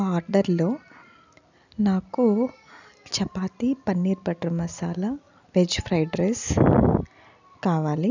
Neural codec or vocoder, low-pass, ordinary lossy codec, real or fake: none; 7.2 kHz; none; real